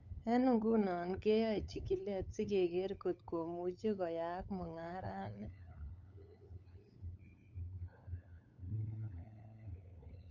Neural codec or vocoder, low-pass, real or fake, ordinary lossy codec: codec, 16 kHz, 8 kbps, FunCodec, trained on LibriTTS, 25 frames a second; 7.2 kHz; fake; none